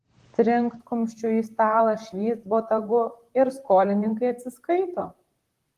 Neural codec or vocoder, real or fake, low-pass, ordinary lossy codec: vocoder, 44.1 kHz, 128 mel bands, Pupu-Vocoder; fake; 14.4 kHz; Opus, 24 kbps